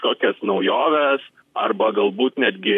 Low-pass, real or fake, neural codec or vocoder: 14.4 kHz; fake; vocoder, 44.1 kHz, 128 mel bands, Pupu-Vocoder